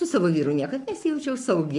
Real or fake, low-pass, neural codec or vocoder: fake; 10.8 kHz; codec, 44.1 kHz, 7.8 kbps, Pupu-Codec